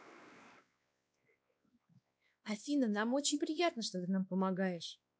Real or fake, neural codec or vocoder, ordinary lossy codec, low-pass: fake; codec, 16 kHz, 2 kbps, X-Codec, WavLM features, trained on Multilingual LibriSpeech; none; none